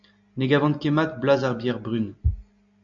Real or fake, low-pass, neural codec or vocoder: real; 7.2 kHz; none